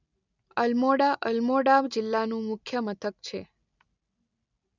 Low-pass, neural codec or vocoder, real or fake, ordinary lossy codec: 7.2 kHz; none; real; none